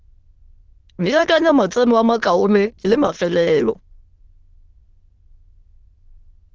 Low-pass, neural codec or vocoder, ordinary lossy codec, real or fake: 7.2 kHz; autoencoder, 22.05 kHz, a latent of 192 numbers a frame, VITS, trained on many speakers; Opus, 16 kbps; fake